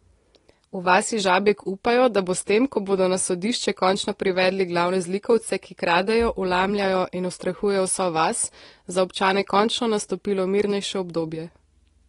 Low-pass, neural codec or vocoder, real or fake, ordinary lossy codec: 10.8 kHz; none; real; AAC, 32 kbps